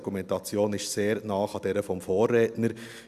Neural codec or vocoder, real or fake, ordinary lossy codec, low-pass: none; real; none; 14.4 kHz